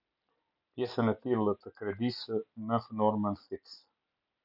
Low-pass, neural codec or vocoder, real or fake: 5.4 kHz; none; real